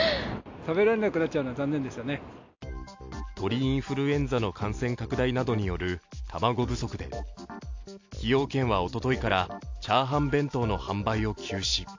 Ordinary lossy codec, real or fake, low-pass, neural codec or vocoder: AAC, 48 kbps; real; 7.2 kHz; none